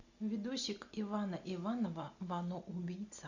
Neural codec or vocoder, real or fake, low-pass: none; real; 7.2 kHz